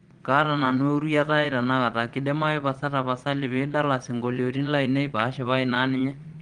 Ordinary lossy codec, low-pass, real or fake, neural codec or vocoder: Opus, 24 kbps; 9.9 kHz; fake; vocoder, 22.05 kHz, 80 mel bands, Vocos